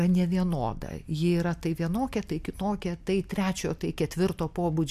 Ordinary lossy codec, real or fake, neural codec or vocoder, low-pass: MP3, 96 kbps; real; none; 14.4 kHz